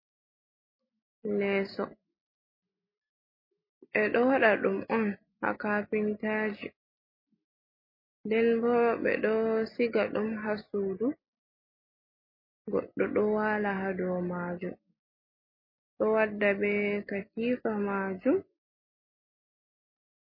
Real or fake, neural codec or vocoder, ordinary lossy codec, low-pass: real; none; MP3, 24 kbps; 5.4 kHz